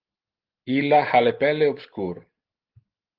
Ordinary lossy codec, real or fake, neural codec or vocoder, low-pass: Opus, 16 kbps; real; none; 5.4 kHz